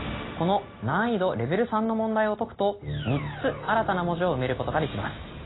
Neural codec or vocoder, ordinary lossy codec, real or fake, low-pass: none; AAC, 16 kbps; real; 7.2 kHz